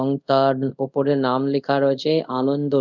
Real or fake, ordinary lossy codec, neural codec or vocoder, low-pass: fake; none; codec, 24 kHz, 0.5 kbps, DualCodec; 7.2 kHz